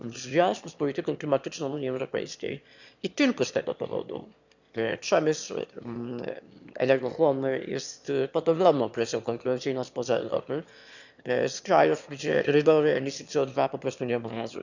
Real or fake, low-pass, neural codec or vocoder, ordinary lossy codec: fake; 7.2 kHz; autoencoder, 22.05 kHz, a latent of 192 numbers a frame, VITS, trained on one speaker; none